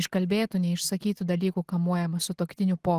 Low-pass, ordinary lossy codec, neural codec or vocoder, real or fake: 14.4 kHz; Opus, 16 kbps; none; real